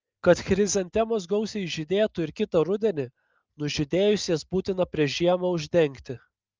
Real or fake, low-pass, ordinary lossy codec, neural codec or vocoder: real; 7.2 kHz; Opus, 24 kbps; none